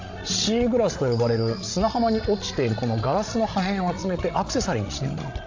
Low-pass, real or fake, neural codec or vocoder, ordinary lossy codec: 7.2 kHz; fake; codec, 16 kHz, 16 kbps, FreqCodec, larger model; AAC, 48 kbps